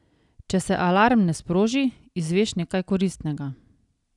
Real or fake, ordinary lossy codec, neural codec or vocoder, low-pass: real; none; none; 10.8 kHz